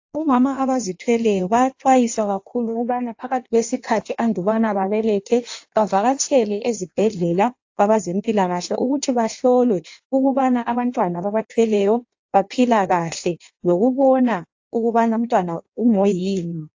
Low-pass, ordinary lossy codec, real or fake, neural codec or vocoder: 7.2 kHz; AAC, 48 kbps; fake; codec, 16 kHz in and 24 kHz out, 1.1 kbps, FireRedTTS-2 codec